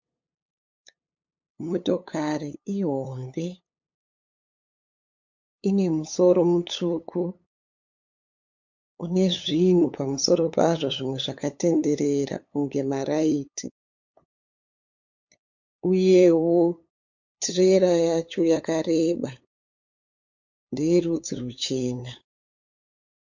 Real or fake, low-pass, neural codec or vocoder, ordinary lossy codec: fake; 7.2 kHz; codec, 16 kHz, 8 kbps, FunCodec, trained on LibriTTS, 25 frames a second; MP3, 48 kbps